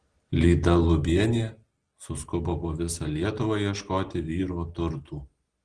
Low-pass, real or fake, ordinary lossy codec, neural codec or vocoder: 9.9 kHz; real; Opus, 16 kbps; none